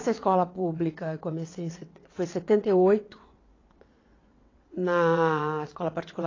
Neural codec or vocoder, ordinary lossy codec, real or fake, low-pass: vocoder, 22.05 kHz, 80 mel bands, WaveNeXt; AAC, 32 kbps; fake; 7.2 kHz